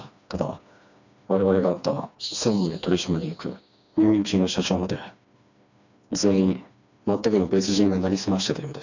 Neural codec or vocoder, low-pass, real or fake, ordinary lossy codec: codec, 16 kHz, 2 kbps, FreqCodec, smaller model; 7.2 kHz; fake; none